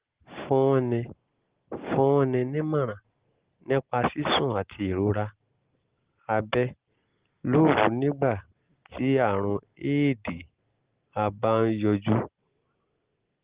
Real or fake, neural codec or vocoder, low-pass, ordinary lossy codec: real; none; 3.6 kHz; Opus, 16 kbps